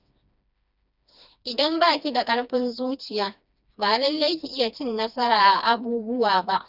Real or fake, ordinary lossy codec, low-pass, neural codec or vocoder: fake; none; 5.4 kHz; codec, 16 kHz, 2 kbps, FreqCodec, smaller model